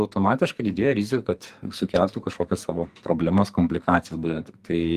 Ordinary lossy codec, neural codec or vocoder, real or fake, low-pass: Opus, 32 kbps; codec, 44.1 kHz, 2.6 kbps, SNAC; fake; 14.4 kHz